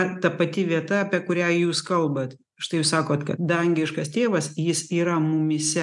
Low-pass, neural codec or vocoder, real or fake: 10.8 kHz; none; real